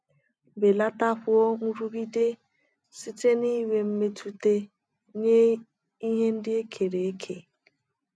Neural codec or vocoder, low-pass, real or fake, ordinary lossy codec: none; none; real; none